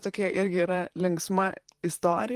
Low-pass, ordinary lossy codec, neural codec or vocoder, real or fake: 14.4 kHz; Opus, 24 kbps; vocoder, 44.1 kHz, 128 mel bands, Pupu-Vocoder; fake